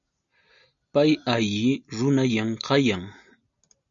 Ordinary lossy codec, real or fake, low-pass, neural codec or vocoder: MP3, 96 kbps; real; 7.2 kHz; none